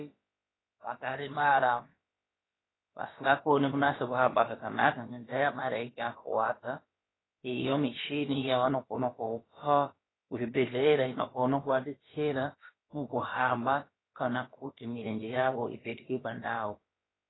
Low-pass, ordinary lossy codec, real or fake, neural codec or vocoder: 7.2 kHz; AAC, 16 kbps; fake; codec, 16 kHz, about 1 kbps, DyCAST, with the encoder's durations